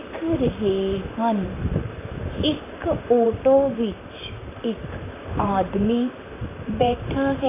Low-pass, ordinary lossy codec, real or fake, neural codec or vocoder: 3.6 kHz; AAC, 16 kbps; fake; vocoder, 44.1 kHz, 128 mel bands, Pupu-Vocoder